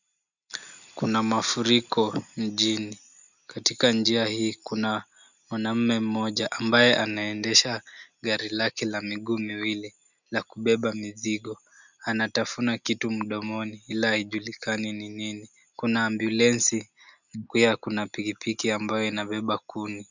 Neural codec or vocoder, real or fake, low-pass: none; real; 7.2 kHz